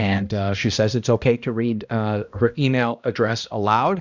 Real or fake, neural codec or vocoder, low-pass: fake; codec, 16 kHz, 1 kbps, X-Codec, HuBERT features, trained on balanced general audio; 7.2 kHz